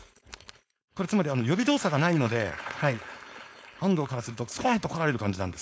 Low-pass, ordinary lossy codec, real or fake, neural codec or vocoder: none; none; fake; codec, 16 kHz, 4.8 kbps, FACodec